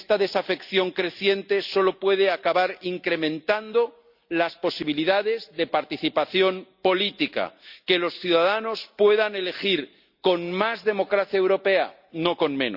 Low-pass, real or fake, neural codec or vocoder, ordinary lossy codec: 5.4 kHz; real; none; Opus, 64 kbps